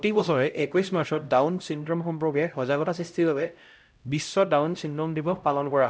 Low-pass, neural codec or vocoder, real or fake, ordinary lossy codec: none; codec, 16 kHz, 0.5 kbps, X-Codec, HuBERT features, trained on LibriSpeech; fake; none